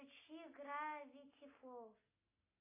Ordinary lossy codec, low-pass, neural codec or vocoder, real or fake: AAC, 16 kbps; 3.6 kHz; none; real